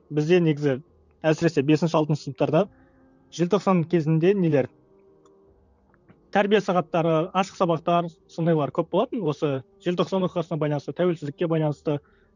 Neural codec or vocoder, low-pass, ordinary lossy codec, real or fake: vocoder, 44.1 kHz, 128 mel bands, Pupu-Vocoder; 7.2 kHz; none; fake